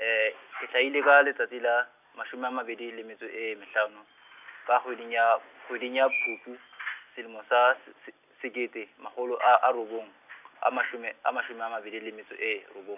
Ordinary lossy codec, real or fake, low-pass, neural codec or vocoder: none; real; 3.6 kHz; none